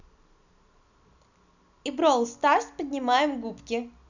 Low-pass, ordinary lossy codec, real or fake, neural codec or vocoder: 7.2 kHz; none; real; none